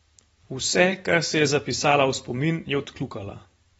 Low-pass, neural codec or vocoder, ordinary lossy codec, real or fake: 19.8 kHz; vocoder, 44.1 kHz, 128 mel bands every 512 samples, BigVGAN v2; AAC, 24 kbps; fake